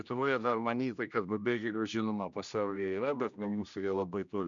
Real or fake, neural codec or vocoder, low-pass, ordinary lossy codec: fake; codec, 16 kHz, 1 kbps, X-Codec, HuBERT features, trained on general audio; 7.2 kHz; MP3, 96 kbps